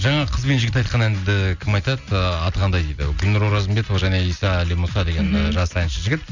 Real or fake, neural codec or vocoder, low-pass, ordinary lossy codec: real; none; 7.2 kHz; none